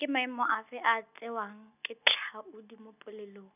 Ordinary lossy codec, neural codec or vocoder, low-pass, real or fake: none; none; 3.6 kHz; real